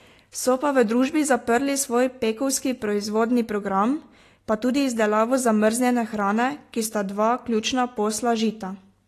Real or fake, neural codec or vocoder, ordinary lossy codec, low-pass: real; none; AAC, 48 kbps; 14.4 kHz